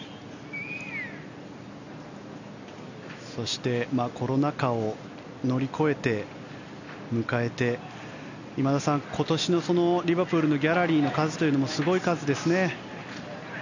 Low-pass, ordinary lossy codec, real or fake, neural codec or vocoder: 7.2 kHz; none; real; none